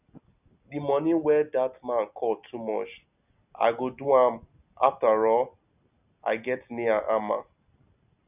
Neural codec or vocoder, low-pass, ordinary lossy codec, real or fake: none; 3.6 kHz; none; real